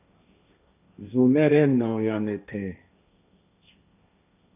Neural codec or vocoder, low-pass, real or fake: codec, 16 kHz, 1.1 kbps, Voila-Tokenizer; 3.6 kHz; fake